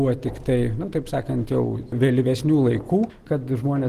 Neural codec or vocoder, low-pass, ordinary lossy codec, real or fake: none; 14.4 kHz; Opus, 16 kbps; real